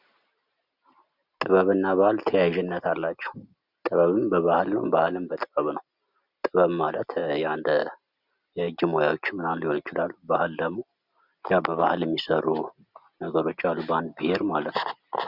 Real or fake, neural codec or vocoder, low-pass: real; none; 5.4 kHz